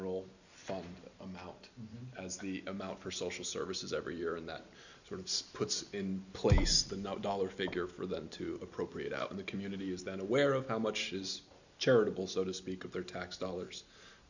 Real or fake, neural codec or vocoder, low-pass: real; none; 7.2 kHz